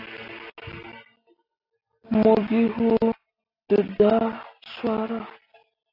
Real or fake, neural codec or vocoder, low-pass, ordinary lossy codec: real; none; 5.4 kHz; AAC, 32 kbps